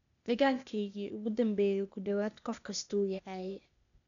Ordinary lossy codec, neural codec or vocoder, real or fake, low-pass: none; codec, 16 kHz, 0.8 kbps, ZipCodec; fake; 7.2 kHz